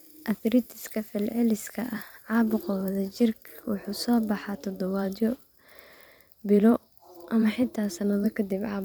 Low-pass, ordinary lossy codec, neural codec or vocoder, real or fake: none; none; vocoder, 44.1 kHz, 128 mel bands every 512 samples, BigVGAN v2; fake